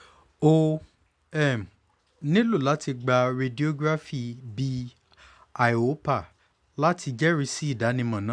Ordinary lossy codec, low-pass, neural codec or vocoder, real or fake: none; 9.9 kHz; none; real